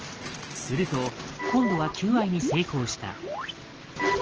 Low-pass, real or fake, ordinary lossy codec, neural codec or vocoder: 7.2 kHz; real; Opus, 16 kbps; none